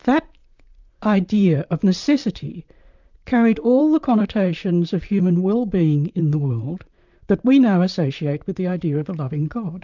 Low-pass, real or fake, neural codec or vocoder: 7.2 kHz; fake; vocoder, 44.1 kHz, 128 mel bands, Pupu-Vocoder